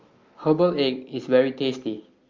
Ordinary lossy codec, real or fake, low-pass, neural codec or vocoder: Opus, 24 kbps; real; 7.2 kHz; none